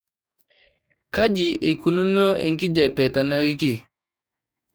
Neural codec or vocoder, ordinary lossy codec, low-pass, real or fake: codec, 44.1 kHz, 2.6 kbps, DAC; none; none; fake